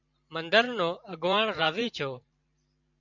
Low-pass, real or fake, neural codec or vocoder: 7.2 kHz; fake; vocoder, 44.1 kHz, 128 mel bands every 512 samples, BigVGAN v2